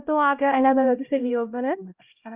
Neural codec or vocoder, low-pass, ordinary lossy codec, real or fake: codec, 16 kHz, 0.5 kbps, X-Codec, HuBERT features, trained on LibriSpeech; 3.6 kHz; Opus, 24 kbps; fake